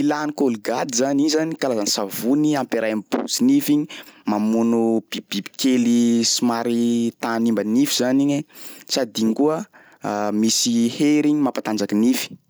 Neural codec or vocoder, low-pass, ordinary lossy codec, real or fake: none; none; none; real